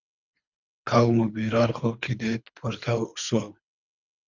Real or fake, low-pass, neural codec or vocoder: fake; 7.2 kHz; codec, 24 kHz, 3 kbps, HILCodec